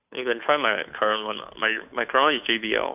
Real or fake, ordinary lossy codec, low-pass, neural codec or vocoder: fake; none; 3.6 kHz; codec, 16 kHz, 2 kbps, FunCodec, trained on Chinese and English, 25 frames a second